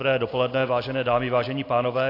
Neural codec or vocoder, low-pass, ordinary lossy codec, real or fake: none; 5.4 kHz; AAC, 32 kbps; real